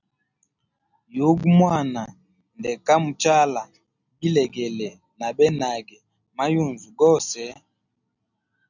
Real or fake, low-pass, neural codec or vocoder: real; 7.2 kHz; none